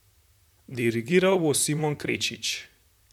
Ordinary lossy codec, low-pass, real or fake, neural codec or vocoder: none; 19.8 kHz; fake; vocoder, 44.1 kHz, 128 mel bands, Pupu-Vocoder